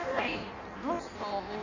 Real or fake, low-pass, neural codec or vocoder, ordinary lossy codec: fake; 7.2 kHz; codec, 16 kHz in and 24 kHz out, 0.6 kbps, FireRedTTS-2 codec; none